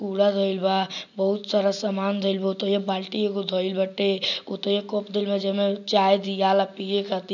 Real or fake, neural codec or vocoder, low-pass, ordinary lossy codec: real; none; 7.2 kHz; none